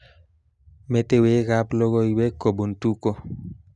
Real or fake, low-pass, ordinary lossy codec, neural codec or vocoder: real; 10.8 kHz; none; none